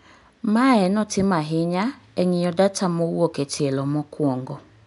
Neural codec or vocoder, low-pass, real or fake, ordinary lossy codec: none; 10.8 kHz; real; none